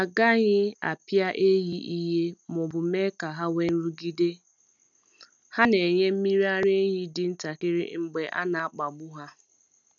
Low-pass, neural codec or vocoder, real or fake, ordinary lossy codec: 7.2 kHz; none; real; none